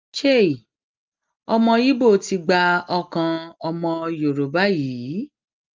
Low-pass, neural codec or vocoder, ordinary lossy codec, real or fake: 7.2 kHz; none; Opus, 32 kbps; real